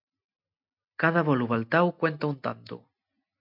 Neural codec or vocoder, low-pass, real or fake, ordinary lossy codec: none; 5.4 kHz; real; MP3, 48 kbps